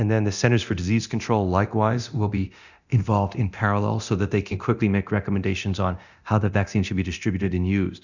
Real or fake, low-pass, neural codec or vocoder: fake; 7.2 kHz; codec, 24 kHz, 0.9 kbps, DualCodec